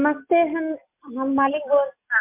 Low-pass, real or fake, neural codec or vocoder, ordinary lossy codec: 3.6 kHz; real; none; MP3, 32 kbps